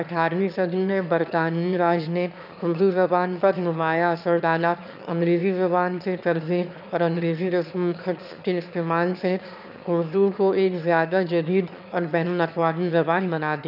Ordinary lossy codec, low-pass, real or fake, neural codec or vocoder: none; 5.4 kHz; fake; autoencoder, 22.05 kHz, a latent of 192 numbers a frame, VITS, trained on one speaker